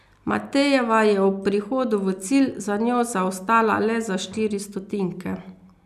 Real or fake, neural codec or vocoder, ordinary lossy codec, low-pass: real; none; none; 14.4 kHz